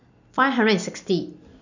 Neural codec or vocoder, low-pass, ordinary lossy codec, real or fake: none; 7.2 kHz; none; real